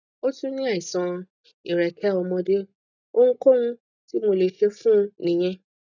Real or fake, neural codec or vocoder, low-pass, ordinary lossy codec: real; none; 7.2 kHz; none